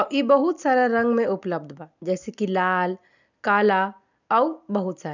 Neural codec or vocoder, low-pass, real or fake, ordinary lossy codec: none; 7.2 kHz; real; none